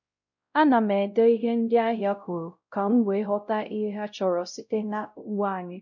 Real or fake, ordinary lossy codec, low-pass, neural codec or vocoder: fake; none; 7.2 kHz; codec, 16 kHz, 0.5 kbps, X-Codec, WavLM features, trained on Multilingual LibriSpeech